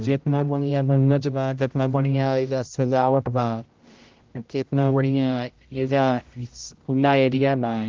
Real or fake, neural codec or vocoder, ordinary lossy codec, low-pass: fake; codec, 16 kHz, 0.5 kbps, X-Codec, HuBERT features, trained on general audio; Opus, 32 kbps; 7.2 kHz